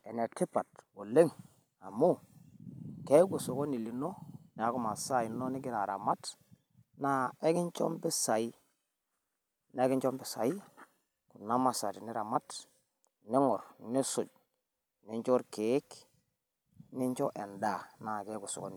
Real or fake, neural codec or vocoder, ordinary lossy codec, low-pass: real; none; none; none